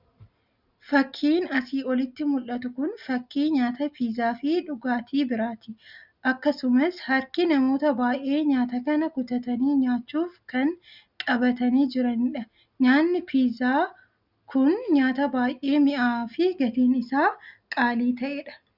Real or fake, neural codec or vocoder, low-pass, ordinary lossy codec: real; none; 5.4 kHz; Opus, 64 kbps